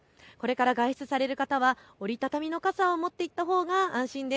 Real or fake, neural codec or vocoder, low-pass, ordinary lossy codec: real; none; none; none